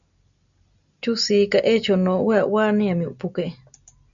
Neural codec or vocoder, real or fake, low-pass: none; real; 7.2 kHz